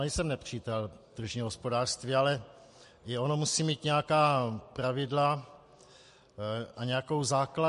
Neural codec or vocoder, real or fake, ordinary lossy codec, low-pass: none; real; MP3, 48 kbps; 14.4 kHz